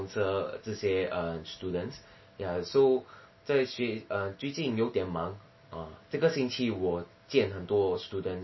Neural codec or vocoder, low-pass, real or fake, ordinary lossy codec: none; 7.2 kHz; real; MP3, 24 kbps